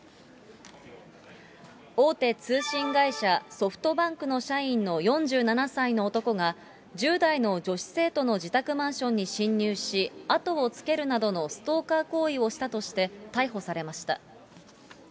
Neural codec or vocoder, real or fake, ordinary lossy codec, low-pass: none; real; none; none